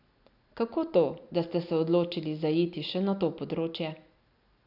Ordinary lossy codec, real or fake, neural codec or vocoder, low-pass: none; real; none; 5.4 kHz